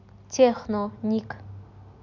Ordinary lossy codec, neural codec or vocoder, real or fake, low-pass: none; none; real; 7.2 kHz